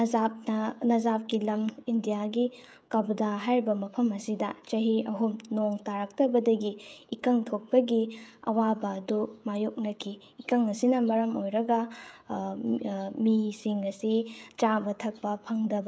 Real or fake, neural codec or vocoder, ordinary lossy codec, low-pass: fake; codec, 16 kHz, 16 kbps, FreqCodec, smaller model; none; none